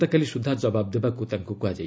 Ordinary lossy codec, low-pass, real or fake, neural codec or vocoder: none; none; real; none